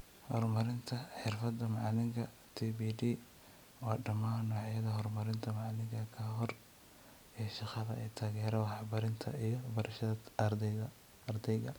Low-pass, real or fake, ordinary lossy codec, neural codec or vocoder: none; real; none; none